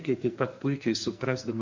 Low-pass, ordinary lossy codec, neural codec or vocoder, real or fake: 7.2 kHz; MP3, 48 kbps; codec, 44.1 kHz, 2.6 kbps, SNAC; fake